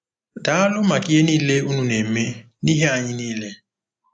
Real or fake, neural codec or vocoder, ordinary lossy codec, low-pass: real; none; AAC, 64 kbps; 9.9 kHz